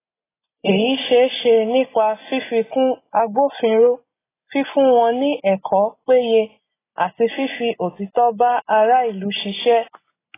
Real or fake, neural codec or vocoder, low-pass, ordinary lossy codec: real; none; 3.6 kHz; AAC, 16 kbps